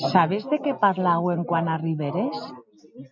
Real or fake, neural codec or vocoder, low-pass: real; none; 7.2 kHz